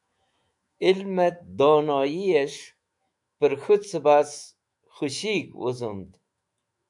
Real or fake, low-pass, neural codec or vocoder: fake; 10.8 kHz; autoencoder, 48 kHz, 128 numbers a frame, DAC-VAE, trained on Japanese speech